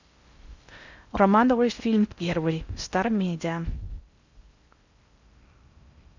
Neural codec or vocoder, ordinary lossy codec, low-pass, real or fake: codec, 16 kHz in and 24 kHz out, 0.6 kbps, FocalCodec, streaming, 4096 codes; Opus, 64 kbps; 7.2 kHz; fake